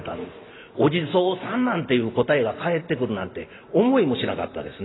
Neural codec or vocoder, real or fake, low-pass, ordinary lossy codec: none; real; 7.2 kHz; AAC, 16 kbps